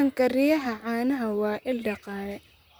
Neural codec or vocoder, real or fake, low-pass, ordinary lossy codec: vocoder, 44.1 kHz, 128 mel bands, Pupu-Vocoder; fake; none; none